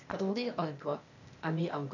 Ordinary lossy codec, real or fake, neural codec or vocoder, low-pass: none; fake; codec, 16 kHz, 0.8 kbps, ZipCodec; 7.2 kHz